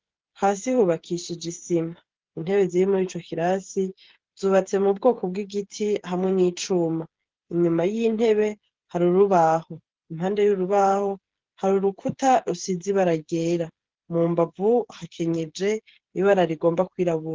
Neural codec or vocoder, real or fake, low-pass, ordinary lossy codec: codec, 16 kHz, 8 kbps, FreqCodec, smaller model; fake; 7.2 kHz; Opus, 16 kbps